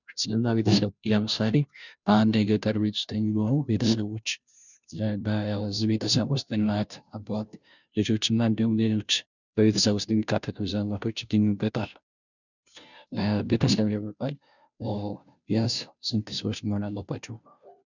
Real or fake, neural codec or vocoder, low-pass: fake; codec, 16 kHz, 0.5 kbps, FunCodec, trained on Chinese and English, 25 frames a second; 7.2 kHz